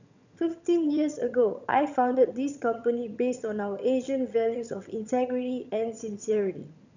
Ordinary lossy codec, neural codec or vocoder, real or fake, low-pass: none; vocoder, 22.05 kHz, 80 mel bands, HiFi-GAN; fake; 7.2 kHz